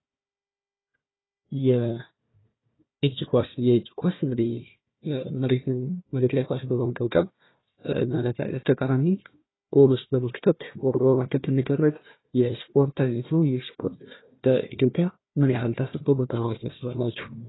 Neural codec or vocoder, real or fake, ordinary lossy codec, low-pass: codec, 16 kHz, 1 kbps, FunCodec, trained on Chinese and English, 50 frames a second; fake; AAC, 16 kbps; 7.2 kHz